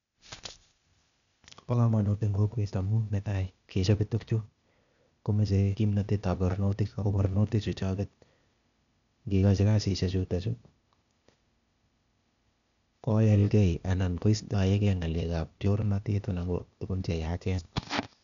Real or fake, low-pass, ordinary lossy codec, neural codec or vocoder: fake; 7.2 kHz; none; codec, 16 kHz, 0.8 kbps, ZipCodec